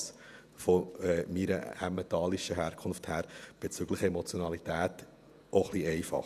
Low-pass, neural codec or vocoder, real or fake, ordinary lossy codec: 14.4 kHz; vocoder, 48 kHz, 128 mel bands, Vocos; fake; none